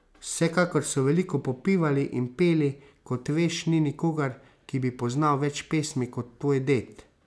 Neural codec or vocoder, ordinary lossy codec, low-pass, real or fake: none; none; none; real